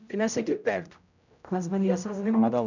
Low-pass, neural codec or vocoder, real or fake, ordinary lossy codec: 7.2 kHz; codec, 16 kHz, 0.5 kbps, X-Codec, HuBERT features, trained on general audio; fake; none